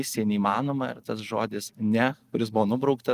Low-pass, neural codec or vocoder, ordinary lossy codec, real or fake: 14.4 kHz; none; Opus, 32 kbps; real